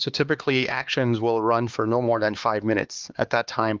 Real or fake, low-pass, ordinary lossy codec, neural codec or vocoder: fake; 7.2 kHz; Opus, 24 kbps; codec, 16 kHz, 2 kbps, X-Codec, HuBERT features, trained on LibriSpeech